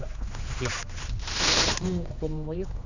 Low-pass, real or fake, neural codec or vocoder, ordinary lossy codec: 7.2 kHz; fake; codec, 16 kHz, 2 kbps, X-Codec, HuBERT features, trained on general audio; none